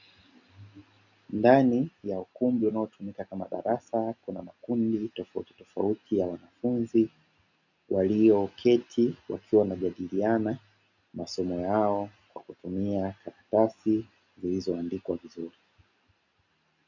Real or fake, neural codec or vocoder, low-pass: real; none; 7.2 kHz